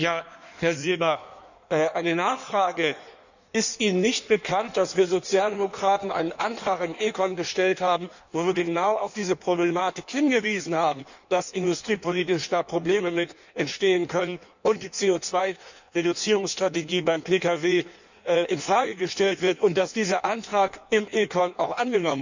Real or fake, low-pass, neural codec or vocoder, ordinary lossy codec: fake; 7.2 kHz; codec, 16 kHz in and 24 kHz out, 1.1 kbps, FireRedTTS-2 codec; none